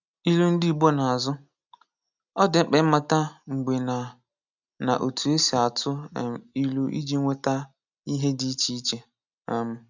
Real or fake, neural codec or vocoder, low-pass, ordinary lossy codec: real; none; 7.2 kHz; none